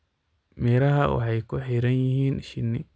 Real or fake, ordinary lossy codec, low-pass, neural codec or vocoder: real; none; none; none